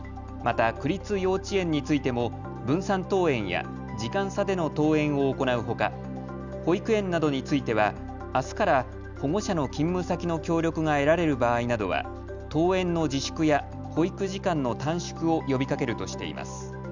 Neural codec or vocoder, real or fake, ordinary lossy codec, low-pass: none; real; none; 7.2 kHz